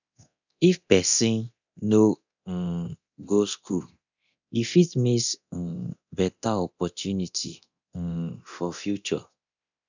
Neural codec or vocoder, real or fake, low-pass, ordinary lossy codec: codec, 24 kHz, 0.9 kbps, DualCodec; fake; 7.2 kHz; none